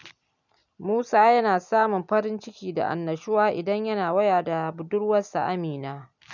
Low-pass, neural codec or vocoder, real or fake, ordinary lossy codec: 7.2 kHz; none; real; none